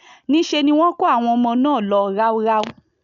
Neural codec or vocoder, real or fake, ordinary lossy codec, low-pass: none; real; none; 7.2 kHz